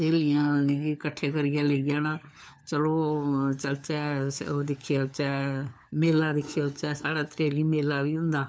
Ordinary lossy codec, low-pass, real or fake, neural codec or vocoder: none; none; fake; codec, 16 kHz, 4 kbps, FunCodec, trained on LibriTTS, 50 frames a second